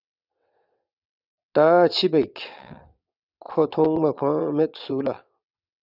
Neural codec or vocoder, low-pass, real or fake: vocoder, 22.05 kHz, 80 mel bands, WaveNeXt; 5.4 kHz; fake